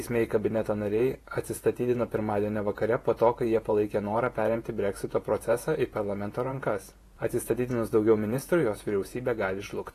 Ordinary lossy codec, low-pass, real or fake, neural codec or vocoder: AAC, 48 kbps; 14.4 kHz; fake; vocoder, 48 kHz, 128 mel bands, Vocos